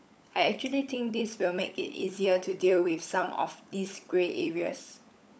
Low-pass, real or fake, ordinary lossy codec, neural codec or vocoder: none; fake; none; codec, 16 kHz, 16 kbps, FunCodec, trained on LibriTTS, 50 frames a second